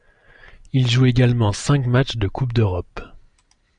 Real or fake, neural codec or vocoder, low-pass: real; none; 9.9 kHz